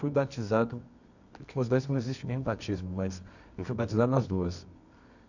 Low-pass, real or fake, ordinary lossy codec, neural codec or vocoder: 7.2 kHz; fake; none; codec, 24 kHz, 0.9 kbps, WavTokenizer, medium music audio release